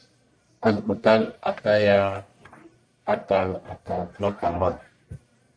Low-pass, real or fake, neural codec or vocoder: 9.9 kHz; fake; codec, 44.1 kHz, 1.7 kbps, Pupu-Codec